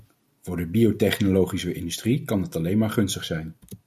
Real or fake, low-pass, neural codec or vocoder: real; 14.4 kHz; none